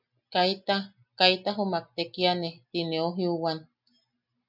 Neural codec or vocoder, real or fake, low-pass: none; real; 5.4 kHz